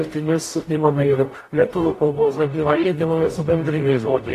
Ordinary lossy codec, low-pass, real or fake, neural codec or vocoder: AAC, 48 kbps; 14.4 kHz; fake; codec, 44.1 kHz, 0.9 kbps, DAC